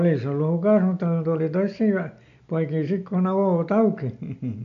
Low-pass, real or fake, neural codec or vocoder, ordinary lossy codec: 7.2 kHz; real; none; MP3, 64 kbps